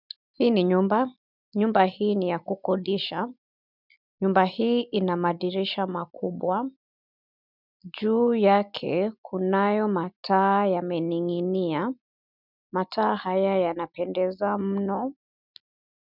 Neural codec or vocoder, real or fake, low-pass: none; real; 5.4 kHz